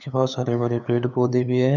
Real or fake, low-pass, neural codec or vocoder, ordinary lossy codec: fake; 7.2 kHz; codec, 16 kHz, 8 kbps, FunCodec, trained on LibriTTS, 25 frames a second; none